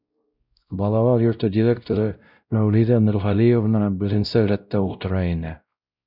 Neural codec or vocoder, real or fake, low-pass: codec, 16 kHz, 0.5 kbps, X-Codec, WavLM features, trained on Multilingual LibriSpeech; fake; 5.4 kHz